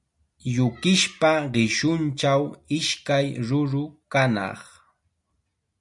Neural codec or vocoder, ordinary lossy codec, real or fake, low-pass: none; MP3, 96 kbps; real; 10.8 kHz